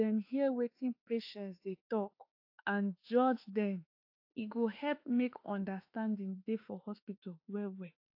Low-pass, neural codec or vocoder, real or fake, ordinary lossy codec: 5.4 kHz; autoencoder, 48 kHz, 32 numbers a frame, DAC-VAE, trained on Japanese speech; fake; AAC, 32 kbps